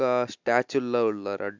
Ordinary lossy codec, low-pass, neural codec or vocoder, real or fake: MP3, 64 kbps; 7.2 kHz; none; real